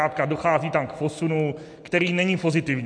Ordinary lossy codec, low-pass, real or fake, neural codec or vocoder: MP3, 64 kbps; 9.9 kHz; real; none